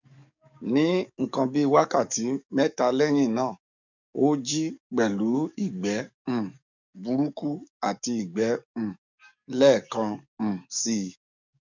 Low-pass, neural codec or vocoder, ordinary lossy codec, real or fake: 7.2 kHz; codec, 44.1 kHz, 7.8 kbps, DAC; none; fake